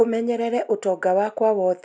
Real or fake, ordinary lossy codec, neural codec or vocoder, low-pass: real; none; none; none